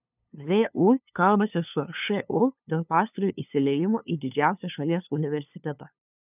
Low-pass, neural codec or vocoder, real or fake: 3.6 kHz; codec, 16 kHz, 2 kbps, FunCodec, trained on LibriTTS, 25 frames a second; fake